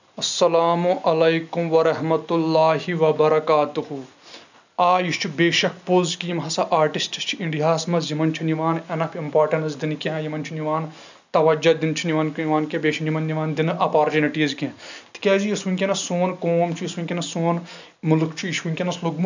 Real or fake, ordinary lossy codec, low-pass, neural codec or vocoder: real; none; 7.2 kHz; none